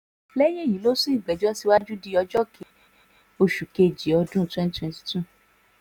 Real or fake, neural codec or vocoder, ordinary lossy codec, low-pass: real; none; none; 19.8 kHz